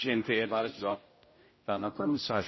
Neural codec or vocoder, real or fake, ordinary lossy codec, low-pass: codec, 16 kHz, 0.5 kbps, X-Codec, HuBERT features, trained on general audio; fake; MP3, 24 kbps; 7.2 kHz